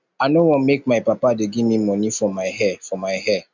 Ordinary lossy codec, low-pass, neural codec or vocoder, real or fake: none; 7.2 kHz; none; real